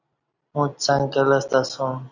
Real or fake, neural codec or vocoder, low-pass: real; none; 7.2 kHz